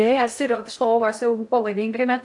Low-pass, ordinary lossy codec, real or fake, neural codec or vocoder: 10.8 kHz; AAC, 64 kbps; fake; codec, 16 kHz in and 24 kHz out, 0.6 kbps, FocalCodec, streaming, 4096 codes